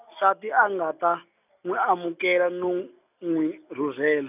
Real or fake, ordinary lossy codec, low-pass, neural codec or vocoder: real; none; 3.6 kHz; none